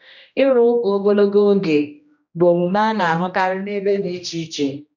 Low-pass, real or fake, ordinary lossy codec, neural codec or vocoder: 7.2 kHz; fake; none; codec, 16 kHz, 1 kbps, X-Codec, HuBERT features, trained on general audio